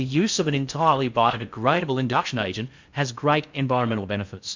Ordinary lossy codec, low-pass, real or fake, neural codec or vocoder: MP3, 64 kbps; 7.2 kHz; fake; codec, 16 kHz in and 24 kHz out, 0.6 kbps, FocalCodec, streaming, 4096 codes